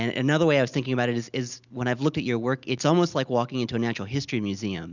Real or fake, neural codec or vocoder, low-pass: real; none; 7.2 kHz